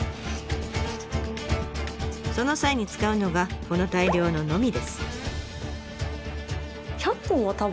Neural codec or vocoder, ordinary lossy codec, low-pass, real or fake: none; none; none; real